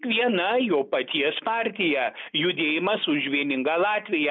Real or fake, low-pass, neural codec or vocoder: real; 7.2 kHz; none